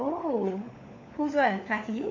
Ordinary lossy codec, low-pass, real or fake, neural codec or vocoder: AAC, 48 kbps; 7.2 kHz; fake; codec, 16 kHz, 4 kbps, FunCodec, trained on LibriTTS, 50 frames a second